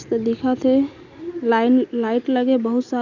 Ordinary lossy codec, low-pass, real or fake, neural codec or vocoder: none; 7.2 kHz; real; none